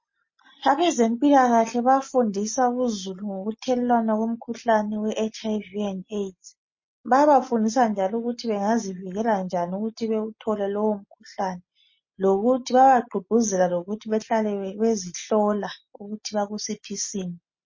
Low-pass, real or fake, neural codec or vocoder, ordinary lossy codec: 7.2 kHz; real; none; MP3, 32 kbps